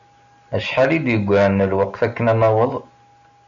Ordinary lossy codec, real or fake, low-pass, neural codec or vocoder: MP3, 96 kbps; real; 7.2 kHz; none